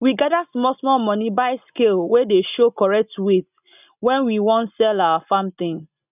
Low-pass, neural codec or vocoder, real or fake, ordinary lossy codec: 3.6 kHz; none; real; none